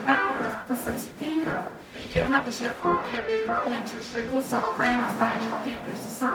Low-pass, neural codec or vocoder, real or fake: 19.8 kHz; codec, 44.1 kHz, 0.9 kbps, DAC; fake